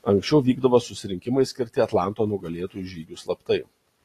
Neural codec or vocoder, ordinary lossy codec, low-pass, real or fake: none; AAC, 48 kbps; 14.4 kHz; real